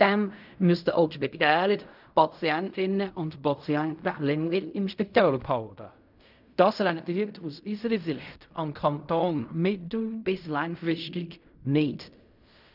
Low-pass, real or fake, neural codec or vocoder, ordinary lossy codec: 5.4 kHz; fake; codec, 16 kHz in and 24 kHz out, 0.4 kbps, LongCat-Audio-Codec, fine tuned four codebook decoder; none